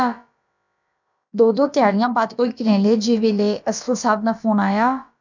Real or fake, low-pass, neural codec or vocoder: fake; 7.2 kHz; codec, 16 kHz, about 1 kbps, DyCAST, with the encoder's durations